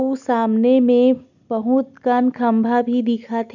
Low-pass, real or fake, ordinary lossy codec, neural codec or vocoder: 7.2 kHz; real; none; none